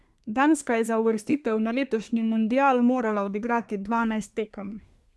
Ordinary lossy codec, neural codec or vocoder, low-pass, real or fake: none; codec, 24 kHz, 1 kbps, SNAC; none; fake